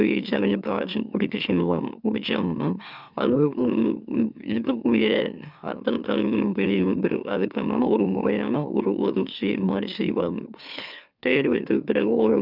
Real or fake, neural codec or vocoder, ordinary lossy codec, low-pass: fake; autoencoder, 44.1 kHz, a latent of 192 numbers a frame, MeloTTS; none; 5.4 kHz